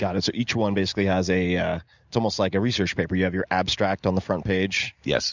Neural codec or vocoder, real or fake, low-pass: none; real; 7.2 kHz